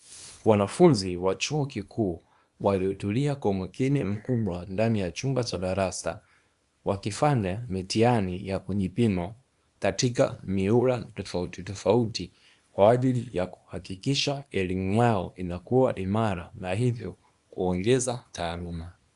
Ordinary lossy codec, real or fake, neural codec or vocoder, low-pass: Opus, 64 kbps; fake; codec, 24 kHz, 0.9 kbps, WavTokenizer, small release; 10.8 kHz